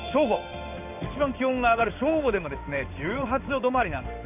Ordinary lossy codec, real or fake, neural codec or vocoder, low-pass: AAC, 32 kbps; fake; codec, 16 kHz in and 24 kHz out, 1 kbps, XY-Tokenizer; 3.6 kHz